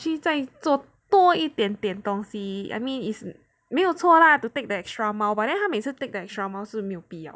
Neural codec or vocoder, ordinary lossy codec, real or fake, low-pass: none; none; real; none